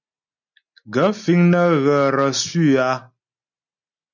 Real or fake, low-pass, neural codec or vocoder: real; 7.2 kHz; none